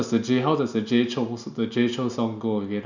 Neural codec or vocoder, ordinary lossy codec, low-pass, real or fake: none; none; 7.2 kHz; real